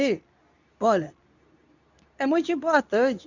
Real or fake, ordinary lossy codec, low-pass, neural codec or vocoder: fake; none; 7.2 kHz; codec, 24 kHz, 0.9 kbps, WavTokenizer, medium speech release version 2